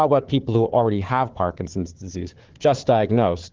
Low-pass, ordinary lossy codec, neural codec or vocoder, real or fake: 7.2 kHz; Opus, 16 kbps; codec, 16 kHz, 4 kbps, FunCodec, trained on Chinese and English, 50 frames a second; fake